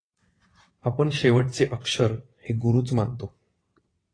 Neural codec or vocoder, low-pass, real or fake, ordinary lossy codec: vocoder, 22.05 kHz, 80 mel bands, WaveNeXt; 9.9 kHz; fake; AAC, 32 kbps